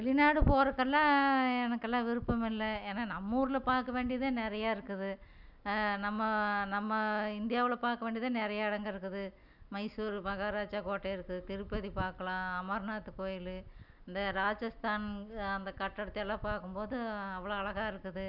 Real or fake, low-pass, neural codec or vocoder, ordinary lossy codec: real; 5.4 kHz; none; none